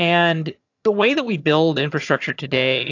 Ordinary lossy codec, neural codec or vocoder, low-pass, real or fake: AAC, 48 kbps; vocoder, 22.05 kHz, 80 mel bands, HiFi-GAN; 7.2 kHz; fake